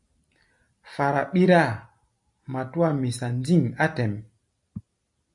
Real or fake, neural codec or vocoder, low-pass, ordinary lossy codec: real; none; 10.8 kHz; MP3, 48 kbps